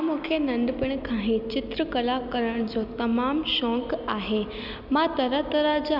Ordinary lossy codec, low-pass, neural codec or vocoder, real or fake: none; 5.4 kHz; none; real